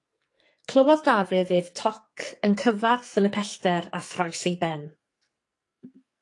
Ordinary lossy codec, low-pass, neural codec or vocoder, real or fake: AAC, 48 kbps; 10.8 kHz; codec, 44.1 kHz, 2.6 kbps, SNAC; fake